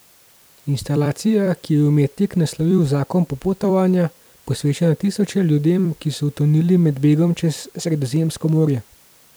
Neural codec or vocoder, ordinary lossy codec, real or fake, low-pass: vocoder, 44.1 kHz, 128 mel bands every 256 samples, BigVGAN v2; none; fake; none